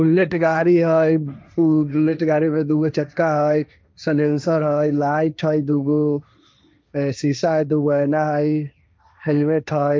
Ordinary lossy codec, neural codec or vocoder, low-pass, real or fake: none; codec, 16 kHz, 1.1 kbps, Voila-Tokenizer; none; fake